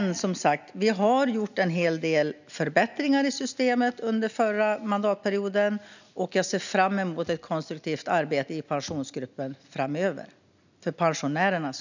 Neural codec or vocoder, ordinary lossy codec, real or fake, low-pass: none; none; real; 7.2 kHz